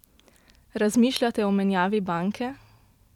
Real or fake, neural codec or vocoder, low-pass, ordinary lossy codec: fake; vocoder, 44.1 kHz, 128 mel bands every 512 samples, BigVGAN v2; 19.8 kHz; none